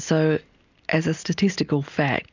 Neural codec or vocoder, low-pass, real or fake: none; 7.2 kHz; real